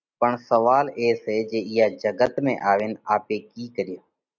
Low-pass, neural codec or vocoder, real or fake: 7.2 kHz; none; real